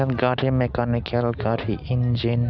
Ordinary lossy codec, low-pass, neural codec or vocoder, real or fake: none; 7.2 kHz; none; real